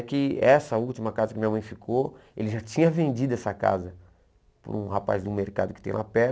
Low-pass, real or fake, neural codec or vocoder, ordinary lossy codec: none; real; none; none